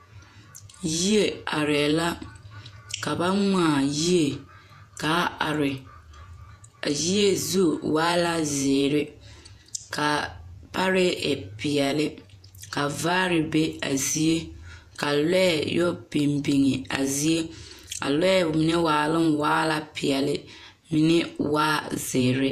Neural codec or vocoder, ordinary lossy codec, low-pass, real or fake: vocoder, 48 kHz, 128 mel bands, Vocos; MP3, 96 kbps; 14.4 kHz; fake